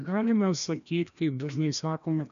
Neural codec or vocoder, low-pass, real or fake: codec, 16 kHz, 1 kbps, FreqCodec, larger model; 7.2 kHz; fake